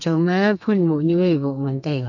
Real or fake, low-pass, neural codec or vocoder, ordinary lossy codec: fake; 7.2 kHz; codec, 32 kHz, 1.9 kbps, SNAC; none